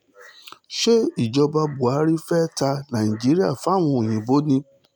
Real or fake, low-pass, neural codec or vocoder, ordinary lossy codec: real; none; none; none